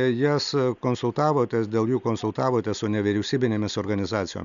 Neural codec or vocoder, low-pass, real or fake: none; 7.2 kHz; real